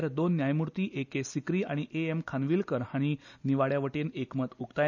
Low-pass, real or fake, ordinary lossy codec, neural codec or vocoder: 7.2 kHz; real; none; none